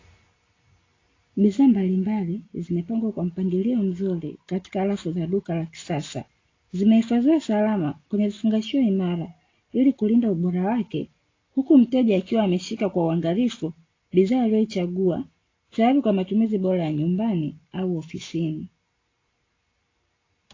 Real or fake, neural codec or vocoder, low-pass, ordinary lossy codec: real; none; 7.2 kHz; AAC, 32 kbps